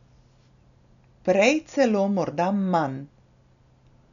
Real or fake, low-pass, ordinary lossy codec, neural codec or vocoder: real; 7.2 kHz; none; none